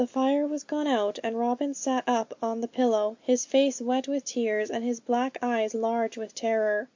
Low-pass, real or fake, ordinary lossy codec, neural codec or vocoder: 7.2 kHz; real; MP3, 48 kbps; none